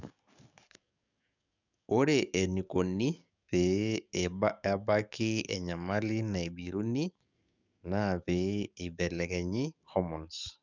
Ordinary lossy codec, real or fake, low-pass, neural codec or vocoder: none; fake; 7.2 kHz; codec, 44.1 kHz, 7.8 kbps, DAC